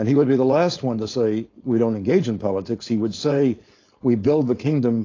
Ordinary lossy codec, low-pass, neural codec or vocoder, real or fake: AAC, 32 kbps; 7.2 kHz; codec, 16 kHz, 4.8 kbps, FACodec; fake